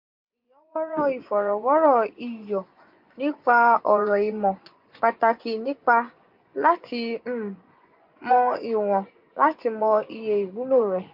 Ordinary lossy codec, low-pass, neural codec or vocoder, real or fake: MP3, 48 kbps; 5.4 kHz; vocoder, 24 kHz, 100 mel bands, Vocos; fake